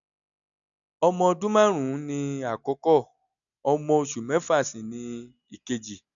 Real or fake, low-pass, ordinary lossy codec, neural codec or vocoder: real; 7.2 kHz; none; none